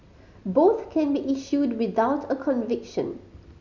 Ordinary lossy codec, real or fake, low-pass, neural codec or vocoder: none; real; 7.2 kHz; none